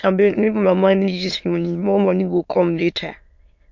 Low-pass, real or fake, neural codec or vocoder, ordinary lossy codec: 7.2 kHz; fake; autoencoder, 22.05 kHz, a latent of 192 numbers a frame, VITS, trained on many speakers; MP3, 48 kbps